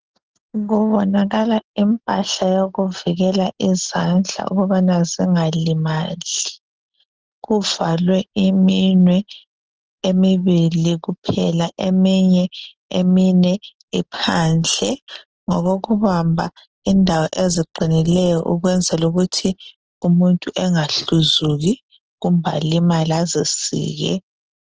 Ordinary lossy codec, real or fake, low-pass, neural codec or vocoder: Opus, 16 kbps; real; 7.2 kHz; none